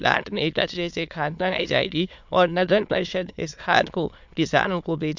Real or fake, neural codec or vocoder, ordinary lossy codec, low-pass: fake; autoencoder, 22.05 kHz, a latent of 192 numbers a frame, VITS, trained on many speakers; MP3, 64 kbps; 7.2 kHz